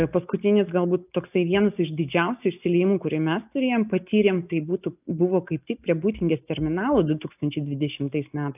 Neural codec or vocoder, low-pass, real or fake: none; 3.6 kHz; real